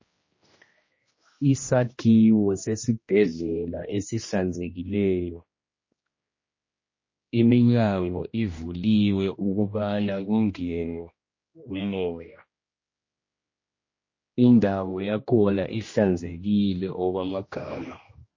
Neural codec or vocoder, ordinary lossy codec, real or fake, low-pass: codec, 16 kHz, 1 kbps, X-Codec, HuBERT features, trained on general audio; MP3, 32 kbps; fake; 7.2 kHz